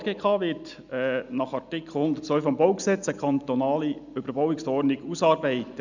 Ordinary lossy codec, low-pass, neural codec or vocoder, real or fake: none; 7.2 kHz; none; real